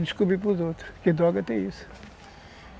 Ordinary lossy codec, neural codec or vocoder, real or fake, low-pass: none; none; real; none